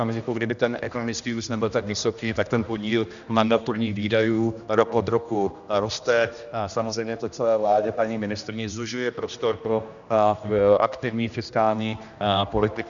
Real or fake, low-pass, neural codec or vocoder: fake; 7.2 kHz; codec, 16 kHz, 1 kbps, X-Codec, HuBERT features, trained on general audio